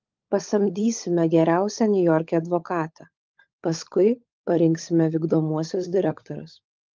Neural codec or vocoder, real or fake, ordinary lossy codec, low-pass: codec, 16 kHz, 16 kbps, FunCodec, trained on LibriTTS, 50 frames a second; fake; Opus, 24 kbps; 7.2 kHz